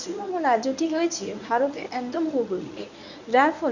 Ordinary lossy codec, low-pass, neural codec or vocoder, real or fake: none; 7.2 kHz; codec, 24 kHz, 0.9 kbps, WavTokenizer, medium speech release version 1; fake